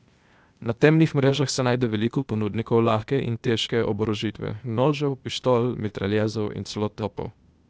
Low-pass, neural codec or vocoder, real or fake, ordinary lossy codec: none; codec, 16 kHz, 0.8 kbps, ZipCodec; fake; none